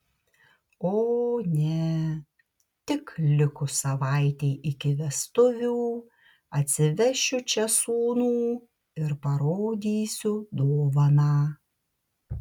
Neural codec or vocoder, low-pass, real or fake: none; 19.8 kHz; real